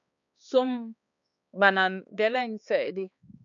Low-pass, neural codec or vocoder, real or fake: 7.2 kHz; codec, 16 kHz, 2 kbps, X-Codec, HuBERT features, trained on balanced general audio; fake